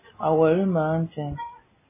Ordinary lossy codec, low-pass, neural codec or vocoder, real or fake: MP3, 16 kbps; 3.6 kHz; none; real